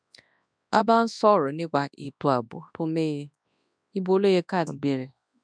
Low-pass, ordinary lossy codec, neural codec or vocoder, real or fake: 9.9 kHz; none; codec, 24 kHz, 0.9 kbps, WavTokenizer, large speech release; fake